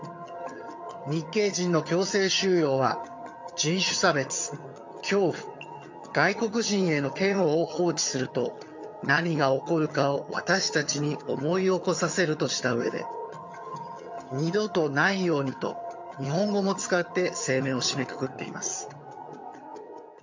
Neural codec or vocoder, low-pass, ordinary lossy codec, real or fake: vocoder, 22.05 kHz, 80 mel bands, HiFi-GAN; 7.2 kHz; MP3, 64 kbps; fake